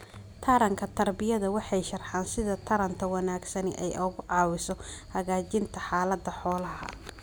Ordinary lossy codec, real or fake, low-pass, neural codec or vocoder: none; real; none; none